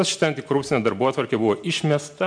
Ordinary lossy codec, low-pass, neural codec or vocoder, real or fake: MP3, 64 kbps; 9.9 kHz; none; real